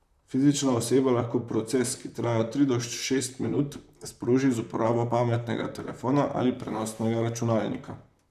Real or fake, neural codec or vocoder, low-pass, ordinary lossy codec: fake; vocoder, 44.1 kHz, 128 mel bands, Pupu-Vocoder; 14.4 kHz; none